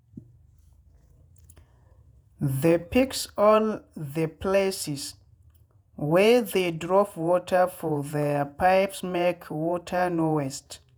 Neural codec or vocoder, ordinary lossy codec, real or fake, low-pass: vocoder, 48 kHz, 128 mel bands, Vocos; none; fake; none